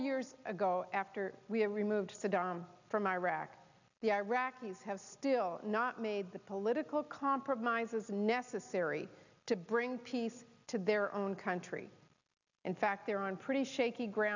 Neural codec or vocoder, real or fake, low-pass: none; real; 7.2 kHz